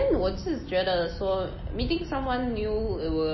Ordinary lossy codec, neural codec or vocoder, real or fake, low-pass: MP3, 24 kbps; none; real; 7.2 kHz